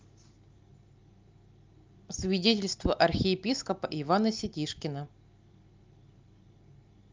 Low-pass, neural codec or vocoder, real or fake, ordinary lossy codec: 7.2 kHz; none; real; Opus, 24 kbps